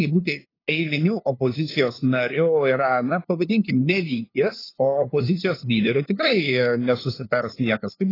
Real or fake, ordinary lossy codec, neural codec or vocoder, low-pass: fake; AAC, 24 kbps; codec, 16 kHz, 2 kbps, FunCodec, trained on LibriTTS, 25 frames a second; 5.4 kHz